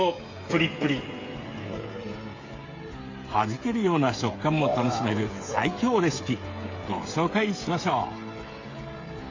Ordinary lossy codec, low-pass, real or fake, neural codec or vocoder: AAC, 32 kbps; 7.2 kHz; fake; codec, 16 kHz, 16 kbps, FreqCodec, smaller model